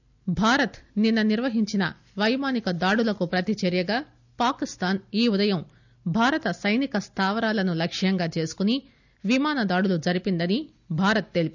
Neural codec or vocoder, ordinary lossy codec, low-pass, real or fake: none; none; 7.2 kHz; real